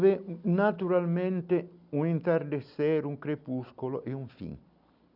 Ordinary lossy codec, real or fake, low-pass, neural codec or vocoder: none; real; 5.4 kHz; none